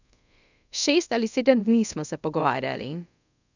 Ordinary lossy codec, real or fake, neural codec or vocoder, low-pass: none; fake; codec, 24 kHz, 0.5 kbps, DualCodec; 7.2 kHz